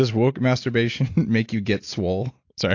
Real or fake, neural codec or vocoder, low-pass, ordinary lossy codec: real; none; 7.2 kHz; AAC, 48 kbps